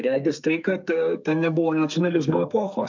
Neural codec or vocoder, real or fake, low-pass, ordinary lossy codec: codec, 32 kHz, 1.9 kbps, SNAC; fake; 7.2 kHz; MP3, 64 kbps